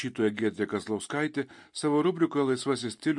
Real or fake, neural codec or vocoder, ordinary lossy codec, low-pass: real; none; MP3, 48 kbps; 10.8 kHz